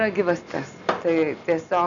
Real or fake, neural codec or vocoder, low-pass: real; none; 7.2 kHz